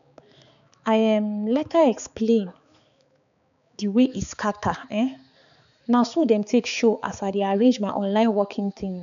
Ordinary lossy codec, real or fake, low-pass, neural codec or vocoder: none; fake; 7.2 kHz; codec, 16 kHz, 4 kbps, X-Codec, HuBERT features, trained on balanced general audio